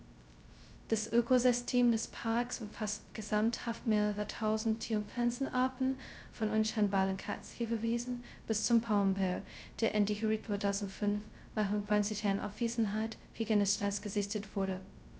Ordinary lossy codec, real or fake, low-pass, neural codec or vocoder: none; fake; none; codec, 16 kHz, 0.2 kbps, FocalCodec